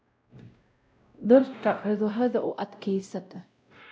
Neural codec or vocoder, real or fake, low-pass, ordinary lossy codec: codec, 16 kHz, 0.5 kbps, X-Codec, WavLM features, trained on Multilingual LibriSpeech; fake; none; none